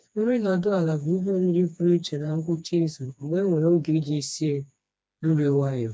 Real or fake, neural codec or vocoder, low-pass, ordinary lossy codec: fake; codec, 16 kHz, 2 kbps, FreqCodec, smaller model; none; none